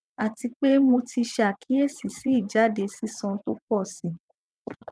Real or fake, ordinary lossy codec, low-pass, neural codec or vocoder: fake; none; none; vocoder, 22.05 kHz, 80 mel bands, WaveNeXt